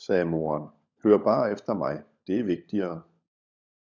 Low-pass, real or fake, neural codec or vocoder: 7.2 kHz; fake; codec, 16 kHz, 16 kbps, FunCodec, trained on LibriTTS, 50 frames a second